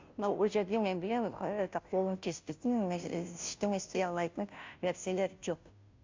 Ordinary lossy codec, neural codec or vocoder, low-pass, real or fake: MP3, 64 kbps; codec, 16 kHz, 0.5 kbps, FunCodec, trained on Chinese and English, 25 frames a second; 7.2 kHz; fake